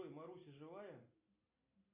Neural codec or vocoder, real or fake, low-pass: none; real; 3.6 kHz